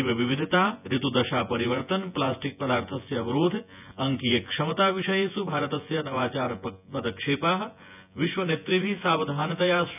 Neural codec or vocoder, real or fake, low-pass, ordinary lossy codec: vocoder, 24 kHz, 100 mel bands, Vocos; fake; 3.6 kHz; none